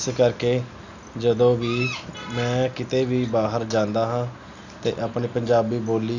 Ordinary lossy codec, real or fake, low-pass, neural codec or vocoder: none; real; 7.2 kHz; none